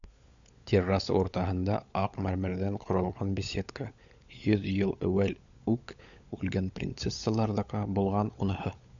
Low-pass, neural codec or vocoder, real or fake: 7.2 kHz; codec, 16 kHz, 16 kbps, FunCodec, trained on LibriTTS, 50 frames a second; fake